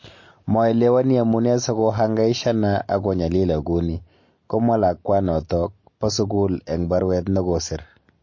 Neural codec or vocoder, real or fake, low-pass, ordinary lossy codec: none; real; 7.2 kHz; MP3, 32 kbps